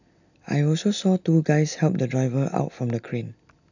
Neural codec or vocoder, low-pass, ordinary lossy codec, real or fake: none; 7.2 kHz; none; real